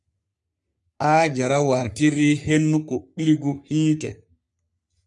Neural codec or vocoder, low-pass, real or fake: codec, 44.1 kHz, 3.4 kbps, Pupu-Codec; 10.8 kHz; fake